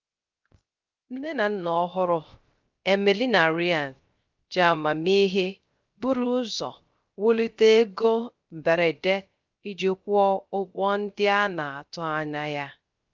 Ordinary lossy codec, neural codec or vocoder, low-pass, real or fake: Opus, 24 kbps; codec, 16 kHz, 0.3 kbps, FocalCodec; 7.2 kHz; fake